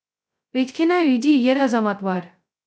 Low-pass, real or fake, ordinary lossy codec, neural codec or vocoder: none; fake; none; codec, 16 kHz, 0.2 kbps, FocalCodec